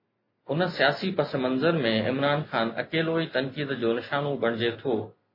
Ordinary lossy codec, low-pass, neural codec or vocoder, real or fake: MP3, 24 kbps; 5.4 kHz; none; real